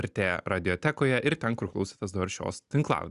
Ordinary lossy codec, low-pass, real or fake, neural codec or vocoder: Opus, 64 kbps; 10.8 kHz; real; none